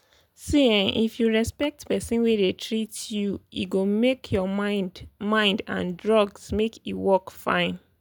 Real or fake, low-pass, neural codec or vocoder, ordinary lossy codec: real; none; none; none